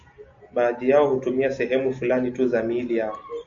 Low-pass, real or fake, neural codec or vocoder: 7.2 kHz; real; none